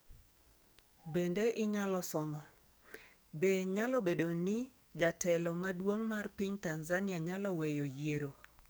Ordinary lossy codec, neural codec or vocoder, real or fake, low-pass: none; codec, 44.1 kHz, 2.6 kbps, SNAC; fake; none